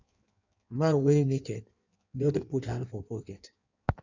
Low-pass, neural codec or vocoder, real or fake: 7.2 kHz; codec, 16 kHz in and 24 kHz out, 1.1 kbps, FireRedTTS-2 codec; fake